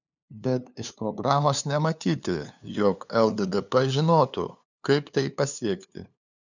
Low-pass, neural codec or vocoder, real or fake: 7.2 kHz; codec, 16 kHz, 2 kbps, FunCodec, trained on LibriTTS, 25 frames a second; fake